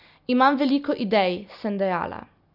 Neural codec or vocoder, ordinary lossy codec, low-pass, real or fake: none; none; 5.4 kHz; real